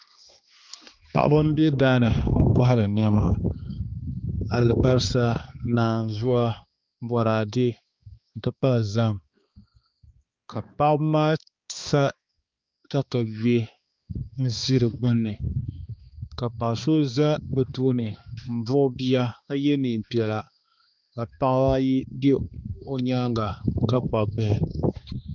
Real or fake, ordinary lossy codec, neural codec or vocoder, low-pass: fake; Opus, 24 kbps; codec, 16 kHz, 2 kbps, X-Codec, HuBERT features, trained on balanced general audio; 7.2 kHz